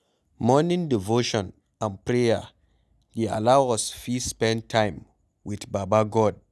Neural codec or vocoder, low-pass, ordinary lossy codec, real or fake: none; none; none; real